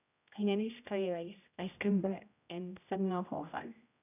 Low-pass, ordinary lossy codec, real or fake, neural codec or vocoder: 3.6 kHz; none; fake; codec, 16 kHz, 0.5 kbps, X-Codec, HuBERT features, trained on general audio